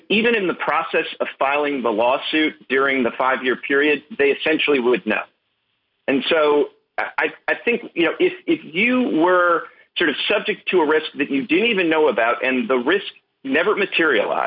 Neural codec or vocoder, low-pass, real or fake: none; 5.4 kHz; real